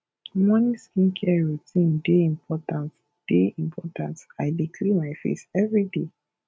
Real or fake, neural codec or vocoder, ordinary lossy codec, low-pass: real; none; none; none